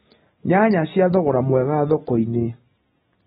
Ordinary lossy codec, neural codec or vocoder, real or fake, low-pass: AAC, 16 kbps; none; real; 19.8 kHz